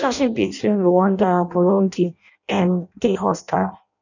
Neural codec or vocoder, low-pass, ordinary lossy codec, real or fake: codec, 16 kHz in and 24 kHz out, 0.6 kbps, FireRedTTS-2 codec; 7.2 kHz; none; fake